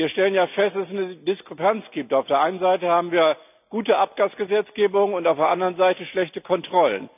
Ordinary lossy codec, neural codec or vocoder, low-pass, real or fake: none; none; 3.6 kHz; real